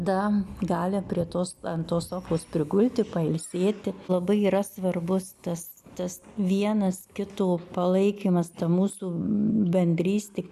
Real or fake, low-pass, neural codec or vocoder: real; 14.4 kHz; none